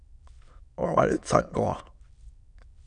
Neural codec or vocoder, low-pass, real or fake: autoencoder, 22.05 kHz, a latent of 192 numbers a frame, VITS, trained on many speakers; 9.9 kHz; fake